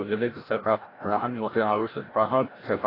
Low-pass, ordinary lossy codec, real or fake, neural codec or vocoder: 5.4 kHz; AAC, 24 kbps; fake; codec, 16 kHz, 0.5 kbps, FreqCodec, larger model